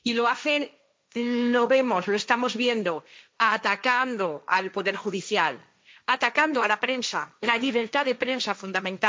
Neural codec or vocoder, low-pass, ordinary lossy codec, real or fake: codec, 16 kHz, 1.1 kbps, Voila-Tokenizer; none; none; fake